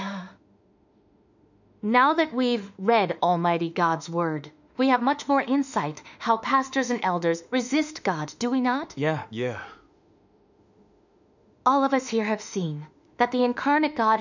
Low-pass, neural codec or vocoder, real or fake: 7.2 kHz; autoencoder, 48 kHz, 32 numbers a frame, DAC-VAE, trained on Japanese speech; fake